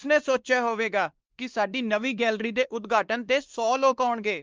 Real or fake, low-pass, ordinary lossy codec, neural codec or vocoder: fake; 7.2 kHz; Opus, 24 kbps; codec, 16 kHz, 2 kbps, X-Codec, WavLM features, trained on Multilingual LibriSpeech